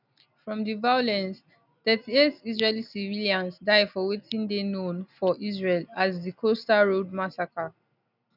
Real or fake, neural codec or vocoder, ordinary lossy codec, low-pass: real; none; none; 5.4 kHz